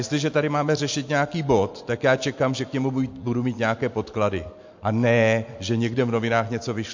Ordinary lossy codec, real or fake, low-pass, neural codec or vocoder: MP3, 48 kbps; real; 7.2 kHz; none